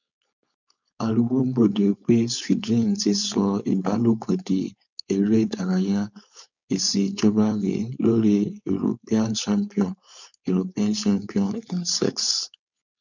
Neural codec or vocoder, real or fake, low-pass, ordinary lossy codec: codec, 16 kHz, 4.8 kbps, FACodec; fake; 7.2 kHz; none